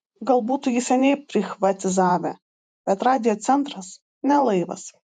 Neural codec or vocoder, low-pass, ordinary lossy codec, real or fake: vocoder, 48 kHz, 128 mel bands, Vocos; 10.8 kHz; MP3, 96 kbps; fake